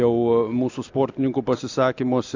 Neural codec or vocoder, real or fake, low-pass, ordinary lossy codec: none; real; 7.2 kHz; AAC, 48 kbps